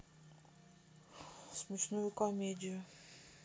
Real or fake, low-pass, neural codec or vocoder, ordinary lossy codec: real; none; none; none